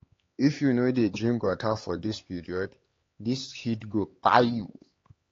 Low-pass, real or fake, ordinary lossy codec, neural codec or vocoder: 7.2 kHz; fake; AAC, 32 kbps; codec, 16 kHz, 4 kbps, X-Codec, HuBERT features, trained on LibriSpeech